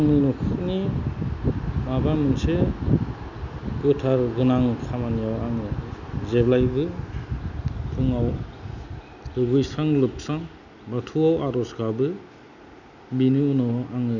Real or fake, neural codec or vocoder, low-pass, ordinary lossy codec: real; none; 7.2 kHz; none